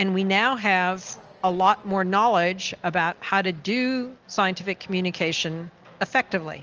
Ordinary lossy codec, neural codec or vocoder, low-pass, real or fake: Opus, 24 kbps; none; 7.2 kHz; real